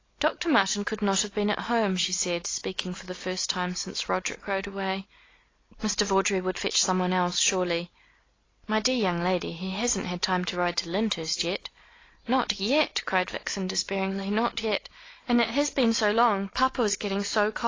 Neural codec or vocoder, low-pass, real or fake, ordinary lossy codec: none; 7.2 kHz; real; AAC, 32 kbps